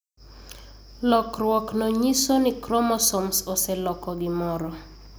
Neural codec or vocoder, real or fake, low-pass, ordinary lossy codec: none; real; none; none